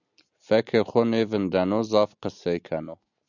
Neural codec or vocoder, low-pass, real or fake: none; 7.2 kHz; real